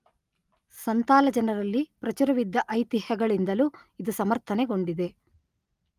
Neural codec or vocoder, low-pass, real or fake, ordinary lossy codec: none; 14.4 kHz; real; Opus, 32 kbps